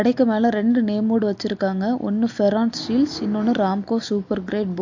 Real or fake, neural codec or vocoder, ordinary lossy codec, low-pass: real; none; MP3, 48 kbps; 7.2 kHz